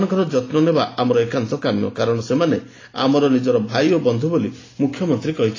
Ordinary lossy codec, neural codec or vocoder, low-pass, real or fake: AAC, 32 kbps; none; 7.2 kHz; real